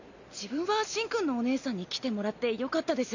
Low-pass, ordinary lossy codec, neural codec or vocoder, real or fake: 7.2 kHz; none; none; real